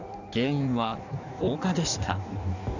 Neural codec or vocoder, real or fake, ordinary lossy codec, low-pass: codec, 16 kHz in and 24 kHz out, 1.1 kbps, FireRedTTS-2 codec; fake; none; 7.2 kHz